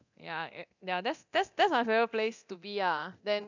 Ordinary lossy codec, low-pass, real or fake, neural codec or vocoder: none; 7.2 kHz; fake; codec, 24 kHz, 0.9 kbps, DualCodec